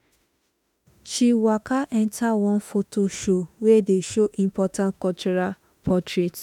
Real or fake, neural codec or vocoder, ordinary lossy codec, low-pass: fake; autoencoder, 48 kHz, 32 numbers a frame, DAC-VAE, trained on Japanese speech; none; 19.8 kHz